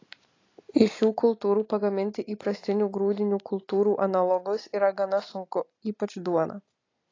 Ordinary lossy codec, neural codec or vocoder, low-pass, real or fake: AAC, 32 kbps; none; 7.2 kHz; real